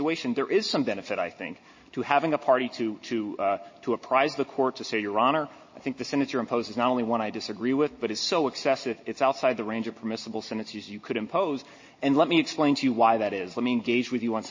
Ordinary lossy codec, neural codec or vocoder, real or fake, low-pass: MP3, 32 kbps; none; real; 7.2 kHz